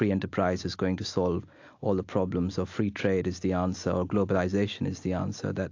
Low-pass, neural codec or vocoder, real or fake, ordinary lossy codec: 7.2 kHz; none; real; AAC, 48 kbps